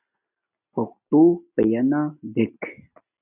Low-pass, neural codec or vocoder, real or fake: 3.6 kHz; none; real